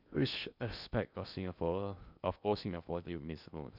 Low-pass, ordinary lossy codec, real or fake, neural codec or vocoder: 5.4 kHz; none; fake; codec, 16 kHz in and 24 kHz out, 0.6 kbps, FocalCodec, streaming, 2048 codes